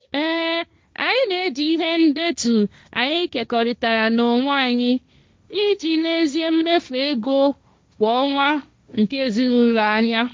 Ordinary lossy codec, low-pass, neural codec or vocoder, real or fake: none; none; codec, 16 kHz, 1.1 kbps, Voila-Tokenizer; fake